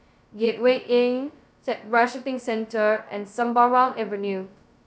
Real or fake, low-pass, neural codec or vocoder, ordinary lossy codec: fake; none; codec, 16 kHz, 0.2 kbps, FocalCodec; none